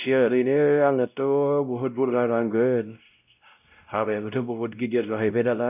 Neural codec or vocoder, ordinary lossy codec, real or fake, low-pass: codec, 16 kHz, 0.5 kbps, X-Codec, WavLM features, trained on Multilingual LibriSpeech; none; fake; 3.6 kHz